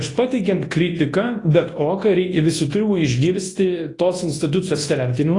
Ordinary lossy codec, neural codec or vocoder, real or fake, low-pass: AAC, 32 kbps; codec, 24 kHz, 0.9 kbps, WavTokenizer, large speech release; fake; 10.8 kHz